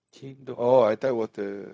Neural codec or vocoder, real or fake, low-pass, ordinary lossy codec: codec, 16 kHz, 0.4 kbps, LongCat-Audio-Codec; fake; none; none